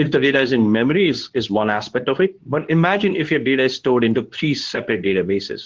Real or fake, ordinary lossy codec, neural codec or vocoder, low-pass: fake; Opus, 16 kbps; codec, 24 kHz, 0.9 kbps, WavTokenizer, medium speech release version 2; 7.2 kHz